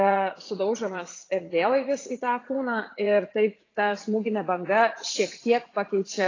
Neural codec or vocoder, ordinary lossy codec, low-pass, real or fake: vocoder, 44.1 kHz, 80 mel bands, Vocos; AAC, 32 kbps; 7.2 kHz; fake